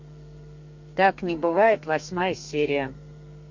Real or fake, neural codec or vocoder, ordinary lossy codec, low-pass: fake; codec, 32 kHz, 1.9 kbps, SNAC; MP3, 48 kbps; 7.2 kHz